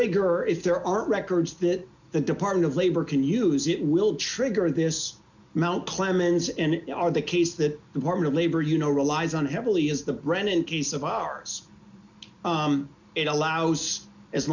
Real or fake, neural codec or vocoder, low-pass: real; none; 7.2 kHz